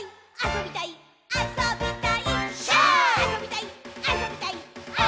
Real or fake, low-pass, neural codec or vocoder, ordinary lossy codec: real; none; none; none